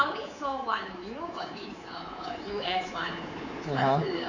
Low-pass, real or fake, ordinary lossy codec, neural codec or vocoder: 7.2 kHz; fake; none; codec, 24 kHz, 3.1 kbps, DualCodec